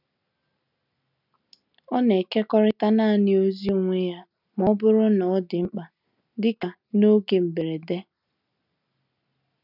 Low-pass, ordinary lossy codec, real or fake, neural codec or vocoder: 5.4 kHz; none; real; none